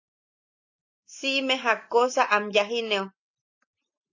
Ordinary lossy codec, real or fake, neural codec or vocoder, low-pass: AAC, 48 kbps; real; none; 7.2 kHz